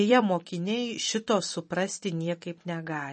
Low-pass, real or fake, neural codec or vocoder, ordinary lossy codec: 10.8 kHz; fake; vocoder, 44.1 kHz, 128 mel bands every 256 samples, BigVGAN v2; MP3, 32 kbps